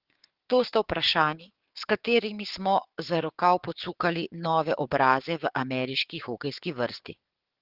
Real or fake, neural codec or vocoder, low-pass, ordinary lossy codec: fake; codec, 16 kHz, 6 kbps, DAC; 5.4 kHz; Opus, 16 kbps